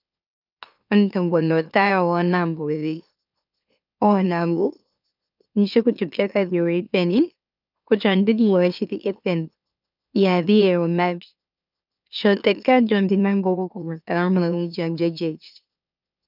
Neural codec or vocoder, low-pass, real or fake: autoencoder, 44.1 kHz, a latent of 192 numbers a frame, MeloTTS; 5.4 kHz; fake